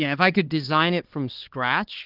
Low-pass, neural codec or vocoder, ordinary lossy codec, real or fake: 5.4 kHz; codec, 16 kHz, 2 kbps, X-Codec, HuBERT features, trained on LibriSpeech; Opus, 16 kbps; fake